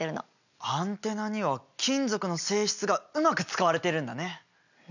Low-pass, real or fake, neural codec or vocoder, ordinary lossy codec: 7.2 kHz; real; none; none